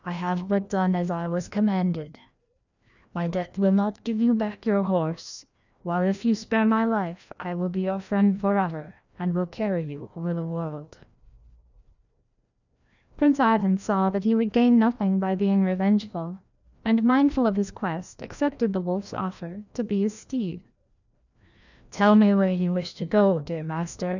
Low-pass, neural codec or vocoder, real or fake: 7.2 kHz; codec, 16 kHz, 1 kbps, FreqCodec, larger model; fake